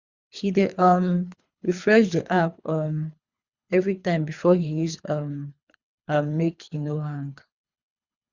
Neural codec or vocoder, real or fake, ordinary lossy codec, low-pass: codec, 24 kHz, 3 kbps, HILCodec; fake; Opus, 64 kbps; 7.2 kHz